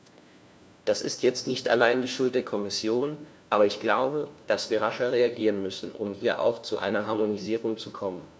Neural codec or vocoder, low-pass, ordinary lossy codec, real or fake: codec, 16 kHz, 1 kbps, FunCodec, trained on LibriTTS, 50 frames a second; none; none; fake